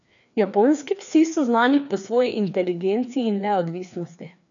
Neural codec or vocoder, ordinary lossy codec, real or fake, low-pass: codec, 16 kHz, 2 kbps, FreqCodec, larger model; none; fake; 7.2 kHz